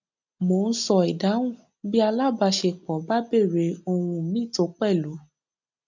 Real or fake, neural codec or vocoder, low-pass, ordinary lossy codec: real; none; 7.2 kHz; none